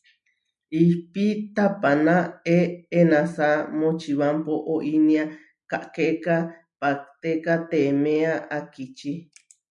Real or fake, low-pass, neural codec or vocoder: real; 10.8 kHz; none